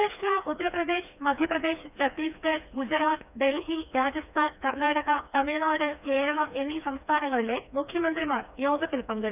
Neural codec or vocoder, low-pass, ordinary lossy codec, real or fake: codec, 16 kHz, 2 kbps, FreqCodec, smaller model; 3.6 kHz; none; fake